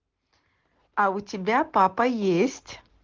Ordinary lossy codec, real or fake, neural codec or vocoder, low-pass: Opus, 16 kbps; real; none; 7.2 kHz